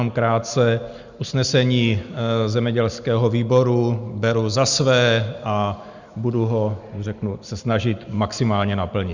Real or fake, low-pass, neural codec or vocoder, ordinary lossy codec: real; 7.2 kHz; none; Opus, 64 kbps